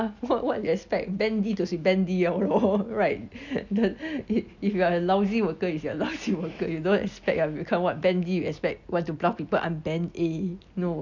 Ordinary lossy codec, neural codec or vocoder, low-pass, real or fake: none; none; 7.2 kHz; real